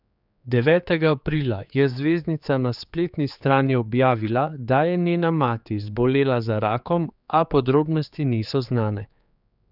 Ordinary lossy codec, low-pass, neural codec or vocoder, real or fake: none; 5.4 kHz; codec, 16 kHz, 4 kbps, X-Codec, HuBERT features, trained on general audio; fake